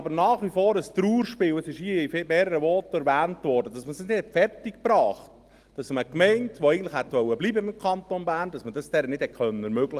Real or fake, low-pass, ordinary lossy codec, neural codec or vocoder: real; 14.4 kHz; Opus, 24 kbps; none